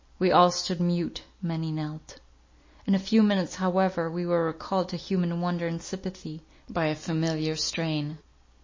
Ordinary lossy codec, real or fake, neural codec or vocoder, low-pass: MP3, 32 kbps; real; none; 7.2 kHz